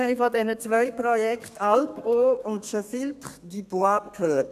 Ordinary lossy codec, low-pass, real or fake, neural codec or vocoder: none; 14.4 kHz; fake; codec, 32 kHz, 1.9 kbps, SNAC